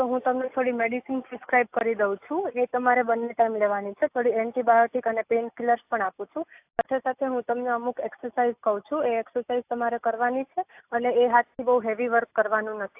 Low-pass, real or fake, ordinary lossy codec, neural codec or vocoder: 3.6 kHz; real; AAC, 32 kbps; none